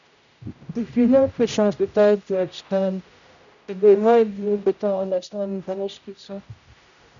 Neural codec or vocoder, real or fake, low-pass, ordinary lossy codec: codec, 16 kHz, 0.5 kbps, X-Codec, HuBERT features, trained on general audio; fake; 7.2 kHz; none